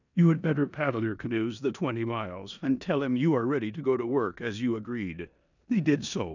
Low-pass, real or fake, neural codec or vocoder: 7.2 kHz; fake; codec, 16 kHz in and 24 kHz out, 0.9 kbps, LongCat-Audio-Codec, four codebook decoder